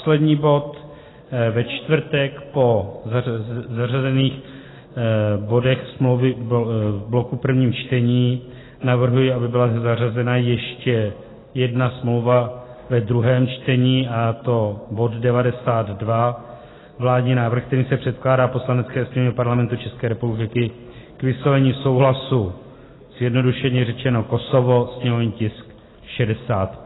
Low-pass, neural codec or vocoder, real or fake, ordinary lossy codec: 7.2 kHz; none; real; AAC, 16 kbps